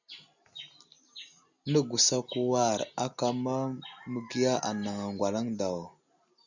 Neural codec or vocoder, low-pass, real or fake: none; 7.2 kHz; real